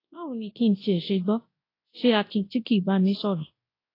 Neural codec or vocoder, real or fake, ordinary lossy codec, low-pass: codec, 24 kHz, 0.9 kbps, WavTokenizer, large speech release; fake; AAC, 24 kbps; 5.4 kHz